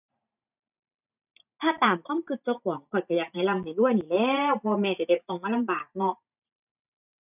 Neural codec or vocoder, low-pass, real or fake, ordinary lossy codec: vocoder, 44.1 kHz, 128 mel bands every 512 samples, BigVGAN v2; 3.6 kHz; fake; none